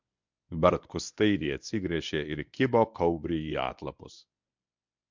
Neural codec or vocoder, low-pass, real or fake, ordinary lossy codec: codec, 24 kHz, 0.9 kbps, WavTokenizer, medium speech release version 1; 7.2 kHz; fake; MP3, 64 kbps